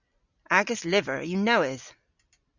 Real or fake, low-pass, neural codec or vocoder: real; 7.2 kHz; none